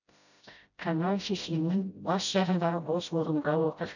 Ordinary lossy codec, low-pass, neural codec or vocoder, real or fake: none; 7.2 kHz; codec, 16 kHz, 0.5 kbps, FreqCodec, smaller model; fake